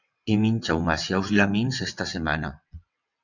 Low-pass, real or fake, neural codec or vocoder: 7.2 kHz; fake; vocoder, 22.05 kHz, 80 mel bands, WaveNeXt